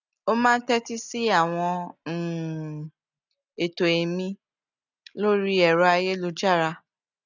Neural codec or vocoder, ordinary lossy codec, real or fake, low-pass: none; none; real; 7.2 kHz